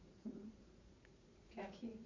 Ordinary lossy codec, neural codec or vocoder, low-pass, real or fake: MP3, 64 kbps; vocoder, 44.1 kHz, 80 mel bands, Vocos; 7.2 kHz; fake